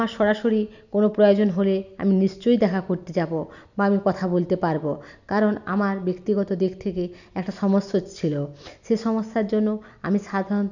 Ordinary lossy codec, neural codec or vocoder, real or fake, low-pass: none; none; real; 7.2 kHz